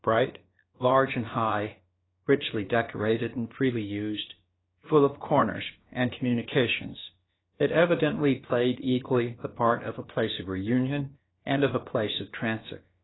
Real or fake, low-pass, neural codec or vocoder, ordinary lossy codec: fake; 7.2 kHz; codec, 16 kHz, about 1 kbps, DyCAST, with the encoder's durations; AAC, 16 kbps